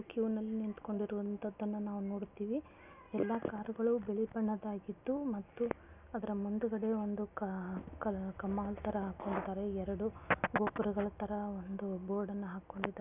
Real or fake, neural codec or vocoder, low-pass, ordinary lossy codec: real; none; 3.6 kHz; none